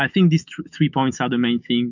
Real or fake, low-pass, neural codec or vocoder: fake; 7.2 kHz; autoencoder, 48 kHz, 128 numbers a frame, DAC-VAE, trained on Japanese speech